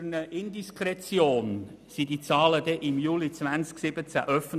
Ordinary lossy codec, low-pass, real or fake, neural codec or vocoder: none; 14.4 kHz; real; none